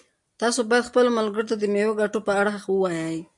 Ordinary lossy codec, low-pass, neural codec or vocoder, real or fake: MP3, 64 kbps; 10.8 kHz; none; real